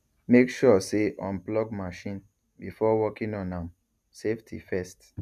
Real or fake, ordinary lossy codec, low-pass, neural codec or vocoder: real; none; 14.4 kHz; none